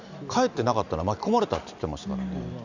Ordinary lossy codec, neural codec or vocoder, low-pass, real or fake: none; none; 7.2 kHz; real